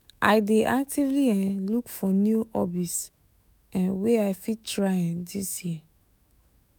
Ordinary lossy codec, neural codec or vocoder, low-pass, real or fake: none; autoencoder, 48 kHz, 128 numbers a frame, DAC-VAE, trained on Japanese speech; none; fake